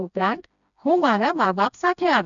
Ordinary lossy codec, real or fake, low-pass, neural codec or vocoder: none; fake; 7.2 kHz; codec, 16 kHz, 1 kbps, FreqCodec, smaller model